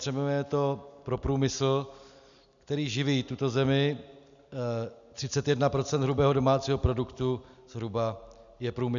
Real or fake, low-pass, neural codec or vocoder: real; 7.2 kHz; none